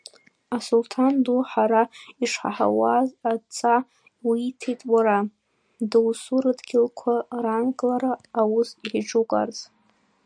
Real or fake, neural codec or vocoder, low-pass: real; none; 9.9 kHz